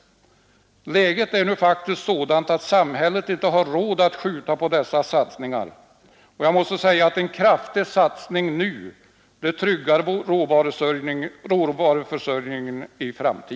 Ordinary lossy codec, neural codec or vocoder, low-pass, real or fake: none; none; none; real